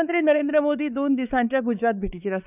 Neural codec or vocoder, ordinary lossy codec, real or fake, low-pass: codec, 16 kHz, 4 kbps, X-Codec, WavLM features, trained on Multilingual LibriSpeech; none; fake; 3.6 kHz